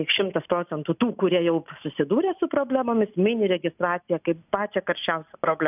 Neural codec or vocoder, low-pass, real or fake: none; 3.6 kHz; real